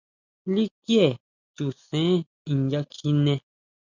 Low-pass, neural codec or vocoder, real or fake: 7.2 kHz; none; real